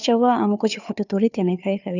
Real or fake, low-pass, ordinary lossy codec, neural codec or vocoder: fake; 7.2 kHz; none; codec, 16 kHz, 2 kbps, FunCodec, trained on Chinese and English, 25 frames a second